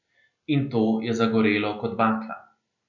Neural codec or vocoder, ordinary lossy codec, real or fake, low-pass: none; none; real; 7.2 kHz